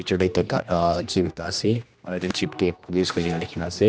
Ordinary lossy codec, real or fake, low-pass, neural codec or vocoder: none; fake; none; codec, 16 kHz, 1 kbps, X-Codec, HuBERT features, trained on general audio